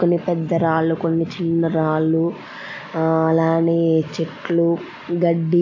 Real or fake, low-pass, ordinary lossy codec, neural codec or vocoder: real; 7.2 kHz; AAC, 48 kbps; none